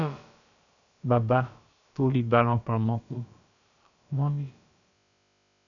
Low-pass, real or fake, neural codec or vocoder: 7.2 kHz; fake; codec, 16 kHz, about 1 kbps, DyCAST, with the encoder's durations